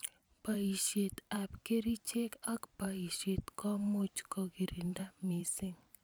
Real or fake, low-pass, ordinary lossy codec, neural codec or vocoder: fake; none; none; vocoder, 44.1 kHz, 128 mel bands every 512 samples, BigVGAN v2